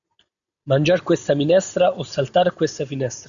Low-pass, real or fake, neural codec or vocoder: 7.2 kHz; real; none